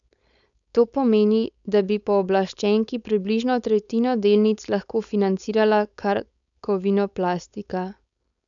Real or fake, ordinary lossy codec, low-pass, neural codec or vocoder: fake; none; 7.2 kHz; codec, 16 kHz, 4.8 kbps, FACodec